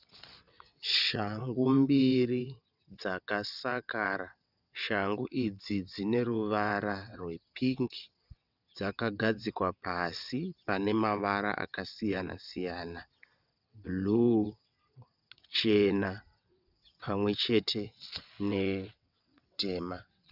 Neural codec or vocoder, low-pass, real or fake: vocoder, 22.05 kHz, 80 mel bands, WaveNeXt; 5.4 kHz; fake